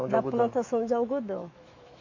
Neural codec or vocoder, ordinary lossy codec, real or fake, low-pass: autoencoder, 48 kHz, 128 numbers a frame, DAC-VAE, trained on Japanese speech; MP3, 48 kbps; fake; 7.2 kHz